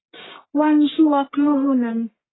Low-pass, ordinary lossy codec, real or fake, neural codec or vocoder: 7.2 kHz; AAC, 16 kbps; fake; codec, 44.1 kHz, 1.7 kbps, Pupu-Codec